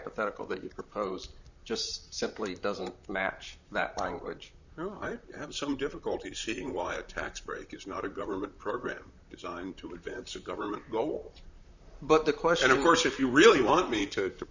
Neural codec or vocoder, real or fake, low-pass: vocoder, 44.1 kHz, 128 mel bands, Pupu-Vocoder; fake; 7.2 kHz